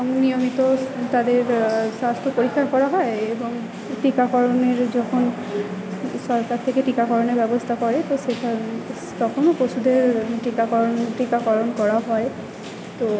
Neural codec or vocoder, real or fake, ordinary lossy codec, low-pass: none; real; none; none